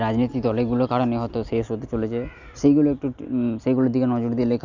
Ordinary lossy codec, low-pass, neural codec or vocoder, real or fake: none; 7.2 kHz; none; real